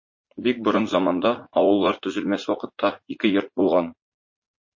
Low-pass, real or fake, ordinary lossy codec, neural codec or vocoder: 7.2 kHz; fake; MP3, 32 kbps; vocoder, 44.1 kHz, 80 mel bands, Vocos